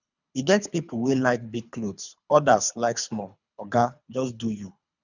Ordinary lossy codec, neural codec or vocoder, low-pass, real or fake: none; codec, 24 kHz, 3 kbps, HILCodec; 7.2 kHz; fake